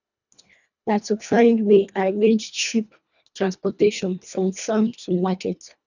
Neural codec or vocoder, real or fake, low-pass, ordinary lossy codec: codec, 24 kHz, 1.5 kbps, HILCodec; fake; 7.2 kHz; none